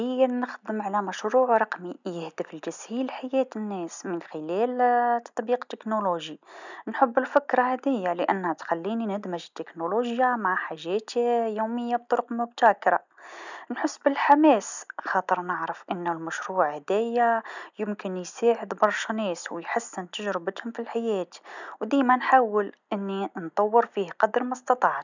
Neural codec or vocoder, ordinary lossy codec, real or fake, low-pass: none; none; real; 7.2 kHz